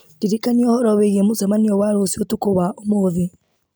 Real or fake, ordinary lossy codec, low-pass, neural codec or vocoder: real; none; none; none